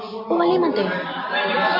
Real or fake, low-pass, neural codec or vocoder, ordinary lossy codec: real; 5.4 kHz; none; MP3, 32 kbps